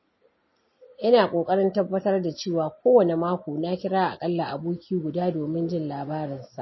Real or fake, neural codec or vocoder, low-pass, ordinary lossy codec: real; none; 7.2 kHz; MP3, 24 kbps